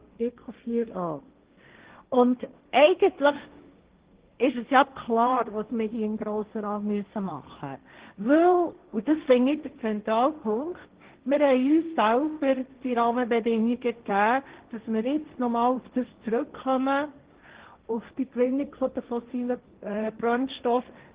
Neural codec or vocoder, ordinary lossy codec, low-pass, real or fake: codec, 16 kHz, 1.1 kbps, Voila-Tokenizer; Opus, 16 kbps; 3.6 kHz; fake